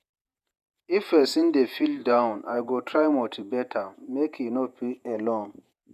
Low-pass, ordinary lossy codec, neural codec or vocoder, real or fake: 14.4 kHz; none; vocoder, 48 kHz, 128 mel bands, Vocos; fake